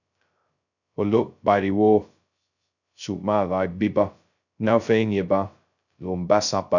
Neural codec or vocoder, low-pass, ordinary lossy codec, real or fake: codec, 16 kHz, 0.2 kbps, FocalCodec; 7.2 kHz; none; fake